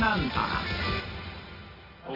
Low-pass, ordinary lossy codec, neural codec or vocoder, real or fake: 5.4 kHz; none; none; real